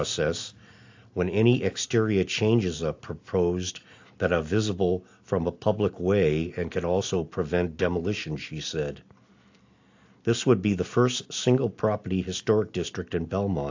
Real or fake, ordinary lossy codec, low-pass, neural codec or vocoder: real; AAC, 48 kbps; 7.2 kHz; none